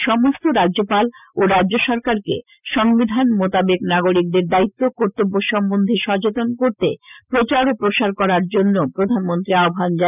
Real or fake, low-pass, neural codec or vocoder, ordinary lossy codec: real; 3.6 kHz; none; none